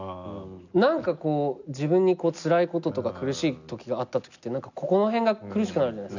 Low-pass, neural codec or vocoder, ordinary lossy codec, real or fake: 7.2 kHz; none; none; real